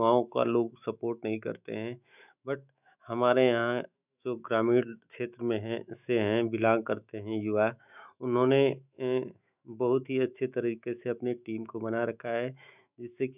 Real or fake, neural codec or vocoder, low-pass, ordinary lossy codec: fake; autoencoder, 48 kHz, 128 numbers a frame, DAC-VAE, trained on Japanese speech; 3.6 kHz; none